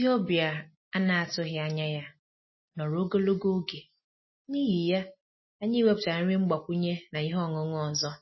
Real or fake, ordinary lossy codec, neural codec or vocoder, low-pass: real; MP3, 24 kbps; none; 7.2 kHz